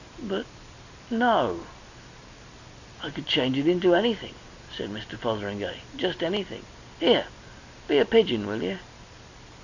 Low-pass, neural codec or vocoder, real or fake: 7.2 kHz; none; real